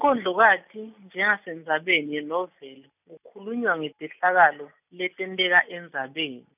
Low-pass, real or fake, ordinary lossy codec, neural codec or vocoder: 3.6 kHz; real; none; none